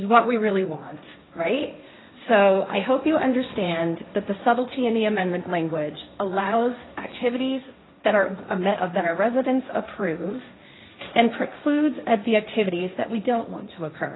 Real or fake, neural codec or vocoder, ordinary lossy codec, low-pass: fake; codec, 16 kHz, 1.1 kbps, Voila-Tokenizer; AAC, 16 kbps; 7.2 kHz